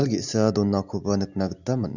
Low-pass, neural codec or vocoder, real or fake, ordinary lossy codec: 7.2 kHz; none; real; none